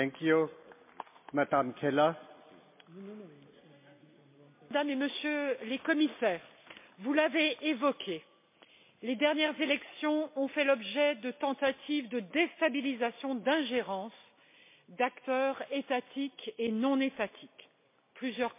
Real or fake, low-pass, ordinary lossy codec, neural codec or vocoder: real; 3.6 kHz; MP3, 24 kbps; none